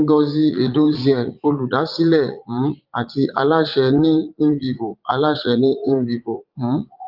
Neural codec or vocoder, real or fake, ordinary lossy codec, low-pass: vocoder, 44.1 kHz, 80 mel bands, Vocos; fake; Opus, 24 kbps; 5.4 kHz